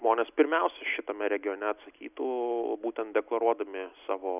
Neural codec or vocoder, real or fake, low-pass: none; real; 3.6 kHz